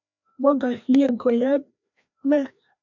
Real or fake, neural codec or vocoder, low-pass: fake; codec, 16 kHz, 1 kbps, FreqCodec, larger model; 7.2 kHz